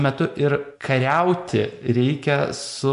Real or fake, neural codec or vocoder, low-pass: real; none; 10.8 kHz